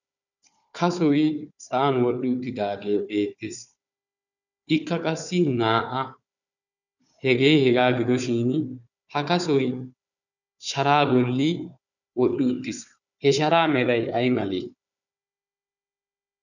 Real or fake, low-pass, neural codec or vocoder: fake; 7.2 kHz; codec, 16 kHz, 4 kbps, FunCodec, trained on Chinese and English, 50 frames a second